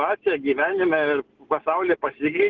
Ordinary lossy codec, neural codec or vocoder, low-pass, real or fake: Opus, 16 kbps; none; 7.2 kHz; real